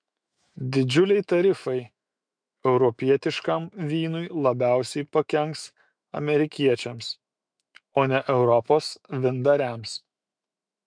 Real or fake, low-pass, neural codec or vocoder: fake; 9.9 kHz; autoencoder, 48 kHz, 128 numbers a frame, DAC-VAE, trained on Japanese speech